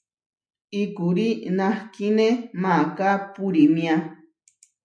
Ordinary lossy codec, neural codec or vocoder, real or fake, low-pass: MP3, 96 kbps; none; real; 9.9 kHz